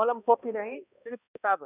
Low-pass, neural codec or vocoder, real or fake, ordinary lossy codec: 3.6 kHz; codec, 16 kHz, 1 kbps, X-Codec, HuBERT features, trained on balanced general audio; fake; none